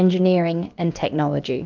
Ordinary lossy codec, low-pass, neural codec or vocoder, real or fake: Opus, 24 kbps; 7.2 kHz; codec, 16 kHz, about 1 kbps, DyCAST, with the encoder's durations; fake